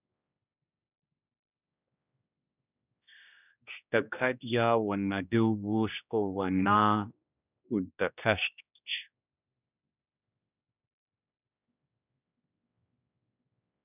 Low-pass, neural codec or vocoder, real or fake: 3.6 kHz; codec, 16 kHz, 1 kbps, X-Codec, HuBERT features, trained on general audio; fake